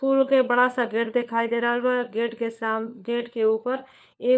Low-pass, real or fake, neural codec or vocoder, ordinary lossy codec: none; fake; codec, 16 kHz, 4 kbps, FunCodec, trained on LibriTTS, 50 frames a second; none